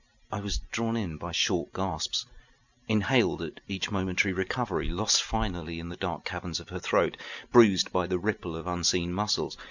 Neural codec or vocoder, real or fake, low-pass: none; real; 7.2 kHz